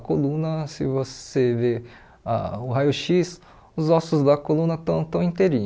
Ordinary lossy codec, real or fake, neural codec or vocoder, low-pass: none; real; none; none